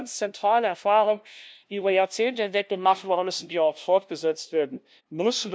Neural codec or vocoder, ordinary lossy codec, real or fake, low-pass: codec, 16 kHz, 0.5 kbps, FunCodec, trained on LibriTTS, 25 frames a second; none; fake; none